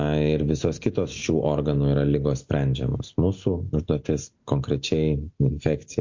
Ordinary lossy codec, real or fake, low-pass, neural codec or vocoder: MP3, 48 kbps; real; 7.2 kHz; none